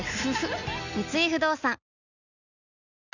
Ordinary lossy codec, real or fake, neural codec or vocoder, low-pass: none; real; none; 7.2 kHz